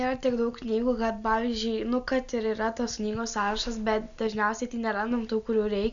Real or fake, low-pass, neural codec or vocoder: real; 7.2 kHz; none